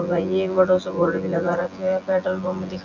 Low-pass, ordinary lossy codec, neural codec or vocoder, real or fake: 7.2 kHz; none; vocoder, 24 kHz, 100 mel bands, Vocos; fake